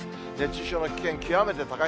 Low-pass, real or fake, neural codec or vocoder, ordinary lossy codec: none; real; none; none